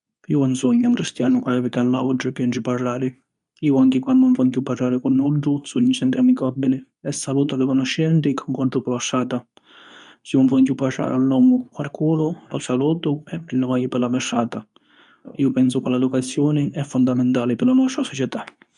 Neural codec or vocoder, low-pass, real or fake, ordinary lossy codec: codec, 24 kHz, 0.9 kbps, WavTokenizer, medium speech release version 2; 10.8 kHz; fake; none